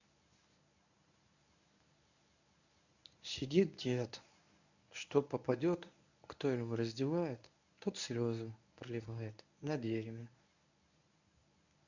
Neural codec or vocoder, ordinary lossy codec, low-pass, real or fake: codec, 24 kHz, 0.9 kbps, WavTokenizer, medium speech release version 1; none; 7.2 kHz; fake